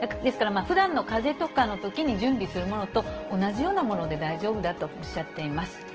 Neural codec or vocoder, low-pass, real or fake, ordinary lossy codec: none; 7.2 kHz; real; Opus, 16 kbps